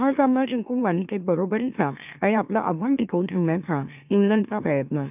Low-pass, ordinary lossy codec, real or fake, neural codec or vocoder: 3.6 kHz; none; fake; autoencoder, 44.1 kHz, a latent of 192 numbers a frame, MeloTTS